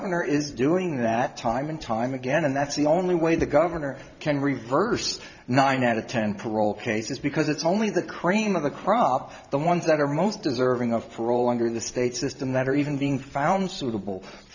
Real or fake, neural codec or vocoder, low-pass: fake; vocoder, 44.1 kHz, 128 mel bands every 512 samples, BigVGAN v2; 7.2 kHz